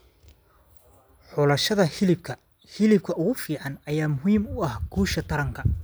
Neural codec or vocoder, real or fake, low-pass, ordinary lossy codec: none; real; none; none